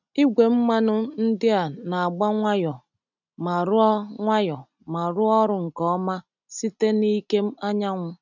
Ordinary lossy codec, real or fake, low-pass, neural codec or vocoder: none; real; 7.2 kHz; none